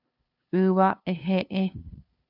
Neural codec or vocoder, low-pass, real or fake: codec, 16 kHz, 2 kbps, FunCodec, trained on LibriTTS, 25 frames a second; 5.4 kHz; fake